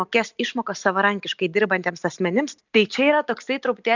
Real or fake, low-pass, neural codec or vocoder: real; 7.2 kHz; none